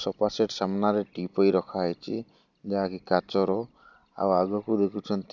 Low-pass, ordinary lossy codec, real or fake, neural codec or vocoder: 7.2 kHz; none; real; none